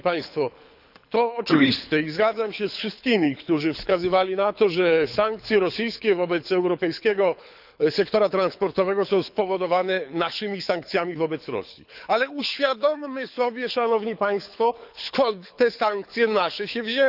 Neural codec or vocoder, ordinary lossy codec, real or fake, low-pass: codec, 24 kHz, 6 kbps, HILCodec; none; fake; 5.4 kHz